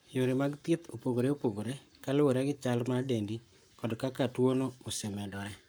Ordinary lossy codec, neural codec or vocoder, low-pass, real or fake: none; codec, 44.1 kHz, 7.8 kbps, Pupu-Codec; none; fake